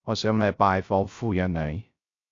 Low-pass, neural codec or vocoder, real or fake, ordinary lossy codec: 7.2 kHz; codec, 16 kHz, 0.3 kbps, FocalCodec; fake; AAC, 48 kbps